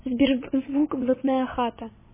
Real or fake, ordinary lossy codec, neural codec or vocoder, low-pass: fake; MP3, 16 kbps; vocoder, 22.05 kHz, 80 mel bands, WaveNeXt; 3.6 kHz